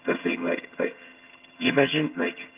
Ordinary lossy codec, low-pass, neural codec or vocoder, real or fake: Opus, 24 kbps; 3.6 kHz; vocoder, 22.05 kHz, 80 mel bands, HiFi-GAN; fake